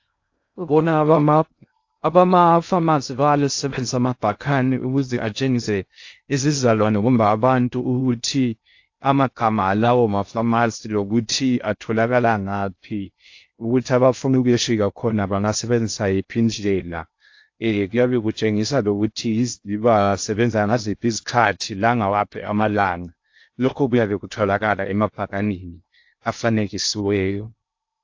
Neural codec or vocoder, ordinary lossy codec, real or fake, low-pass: codec, 16 kHz in and 24 kHz out, 0.6 kbps, FocalCodec, streaming, 2048 codes; AAC, 48 kbps; fake; 7.2 kHz